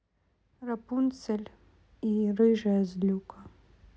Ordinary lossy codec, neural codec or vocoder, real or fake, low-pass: none; none; real; none